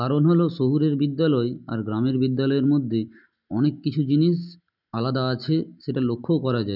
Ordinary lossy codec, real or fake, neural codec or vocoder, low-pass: none; real; none; 5.4 kHz